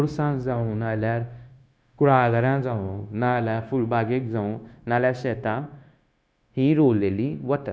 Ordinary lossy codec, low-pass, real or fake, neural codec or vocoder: none; none; fake; codec, 16 kHz, 0.9 kbps, LongCat-Audio-Codec